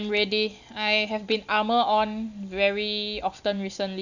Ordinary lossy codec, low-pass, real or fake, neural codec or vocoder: none; 7.2 kHz; real; none